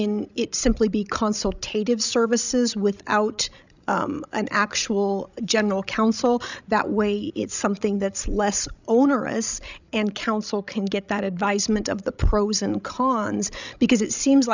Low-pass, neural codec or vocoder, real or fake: 7.2 kHz; codec, 16 kHz, 16 kbps, FreqCodec, larger model; fake